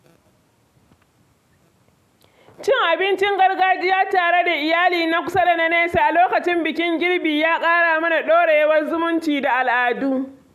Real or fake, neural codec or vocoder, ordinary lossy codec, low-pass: real; none; AAC, 96 kbps; 14.4 kHz